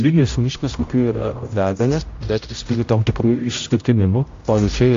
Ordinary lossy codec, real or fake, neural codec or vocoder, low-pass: AAC, 48 kbps; fake; codec, 16 kHz, 0.5 kbps, X-Codec, HuBERT features, trained on general audio; 7.2 kHz